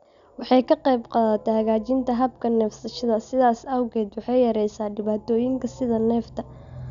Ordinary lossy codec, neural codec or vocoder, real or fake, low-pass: none; none; real; 7.2 kHz